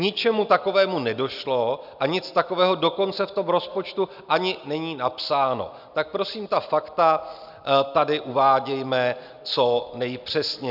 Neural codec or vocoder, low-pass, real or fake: none; 5.4 kHz; real